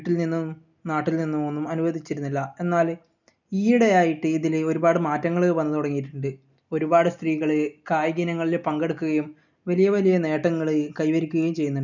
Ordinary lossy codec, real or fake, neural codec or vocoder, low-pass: none; real; none; 7.2 kHz